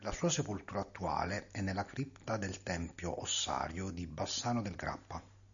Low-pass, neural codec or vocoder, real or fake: 7.2 kHz; none; real